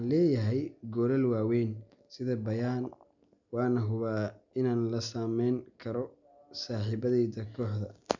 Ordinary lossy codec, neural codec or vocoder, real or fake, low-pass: none; none; real; 7.2 kHz